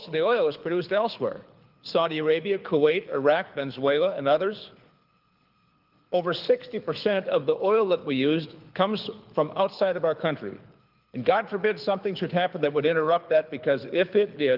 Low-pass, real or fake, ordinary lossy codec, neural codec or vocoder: 5.4 kHz; fake; Opus, 32 kbps; codec, 24 kHz, 6 kbps, HILCodec